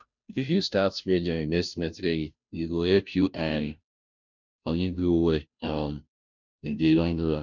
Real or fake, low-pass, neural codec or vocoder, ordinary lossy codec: fake; 7.2 kHz; codec, 16 kHz, 0.5 kbps, FunCodec, trained on Chinese and English, 25 frames a second; none